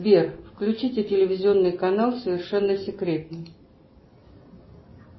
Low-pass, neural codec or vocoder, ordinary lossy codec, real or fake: 7.2 kHz; none; MP3, 24 kbps; real